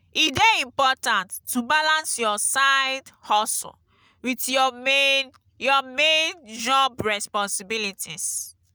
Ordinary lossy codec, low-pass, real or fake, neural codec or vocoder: none; none; real; none